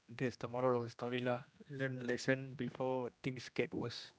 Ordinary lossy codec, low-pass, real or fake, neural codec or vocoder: none; none; fake; codec, 16 kHz, 1 kbps, X-Codec, HuBERT features, trained on general audio